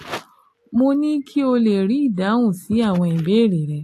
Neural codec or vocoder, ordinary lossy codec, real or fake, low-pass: none; AAC, 48 kbps; real; 14.4 kHz